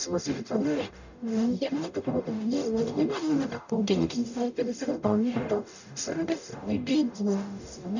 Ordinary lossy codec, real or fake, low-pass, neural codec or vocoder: none; fake; 7.2 kHz; codec, 44.1 kHz, 0.9 kbps, DAC